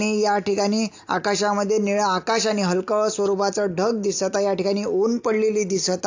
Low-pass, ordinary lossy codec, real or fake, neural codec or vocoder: 7.2 kHz; AAC, 48 kbps; real; none